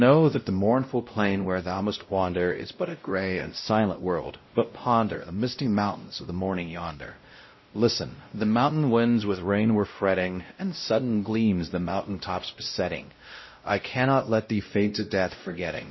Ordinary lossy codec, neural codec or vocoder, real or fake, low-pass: MP3, 24 kbps; codec, 16 kHz, 0.5 kbps, X-Codec, WavLM features, trained on Multilingual LibriSpeech; fake; 7.2 kHz